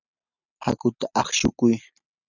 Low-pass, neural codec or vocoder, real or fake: 7.2 kHz; none; real